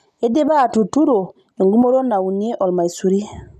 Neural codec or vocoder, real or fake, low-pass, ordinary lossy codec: none; real; 14.4 kHz; none